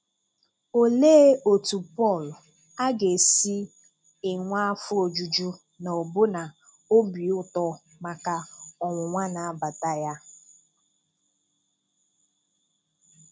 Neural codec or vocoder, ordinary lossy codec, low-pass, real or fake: none; none; none; real